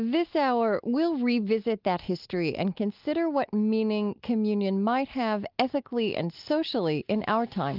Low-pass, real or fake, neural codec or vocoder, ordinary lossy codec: 5.4 kHz; real; none; Opus, 24 kbps